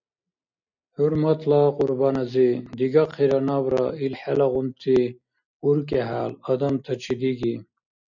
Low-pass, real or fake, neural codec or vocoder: 7.2 kHz; real; none